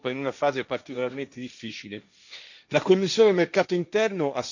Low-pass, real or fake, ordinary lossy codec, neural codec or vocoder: 7.2 kHz; fake; none; codec, 16 kHz, 1.1 kbps, Voila-Tokenizer